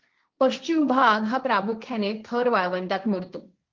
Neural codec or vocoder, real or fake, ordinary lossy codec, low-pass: codec, 16 kHz, 1.1 kbps, Voila-Tokenizer; fake; Opus, 24 kbps; 7.2 kHz